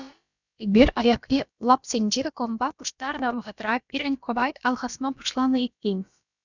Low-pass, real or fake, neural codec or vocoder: 7.2 kHz; fake; codec, 16 kHz, about 1 kbps, DyCAST, with the encoder's durations